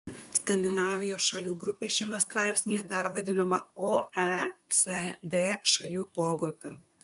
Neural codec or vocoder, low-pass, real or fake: codec, 24 kHz, 1 kbps, SNAC; 10.8 kHz; fake